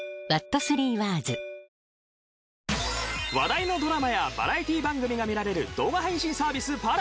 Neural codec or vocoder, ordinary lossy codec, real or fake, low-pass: none; none; real; none